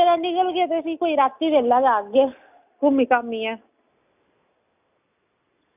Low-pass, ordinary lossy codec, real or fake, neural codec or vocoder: 3.6 kHz; none; real; none